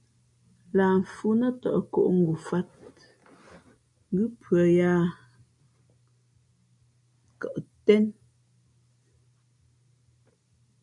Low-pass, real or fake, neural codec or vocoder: 10.8 kHz; real; none